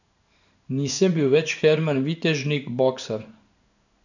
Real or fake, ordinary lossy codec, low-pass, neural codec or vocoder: fake; none; 7.2 kHz; codec, 16 kHz in and 24 kHz out, 1 kbps, XY-Tokenizer